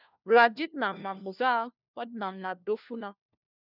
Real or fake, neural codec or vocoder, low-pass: fake; codec, 16 kHz, 1 kbps, FunCodec, trained on LibriTTS, 50 frames a second; 5.4 kHz